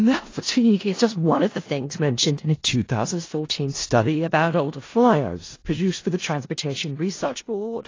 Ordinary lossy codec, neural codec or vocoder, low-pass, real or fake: AAC, 32 kbps; codec, 16 kHz in and 24 kHz out, 0.4 kbps, LongCat-Audio-Codec, four codebook decoder; 7.2 kHz; fake